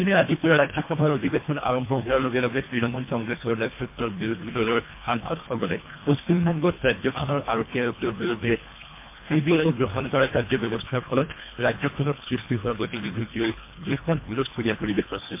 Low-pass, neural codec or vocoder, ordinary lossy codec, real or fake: 3.6 kHz; codec, 24 kHz, 1.5 kbps, HILCodec; MP3, 24 kbps; fake